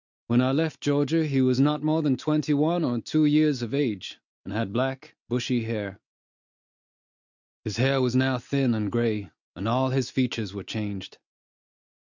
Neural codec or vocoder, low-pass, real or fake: none; 7.2 kHz; real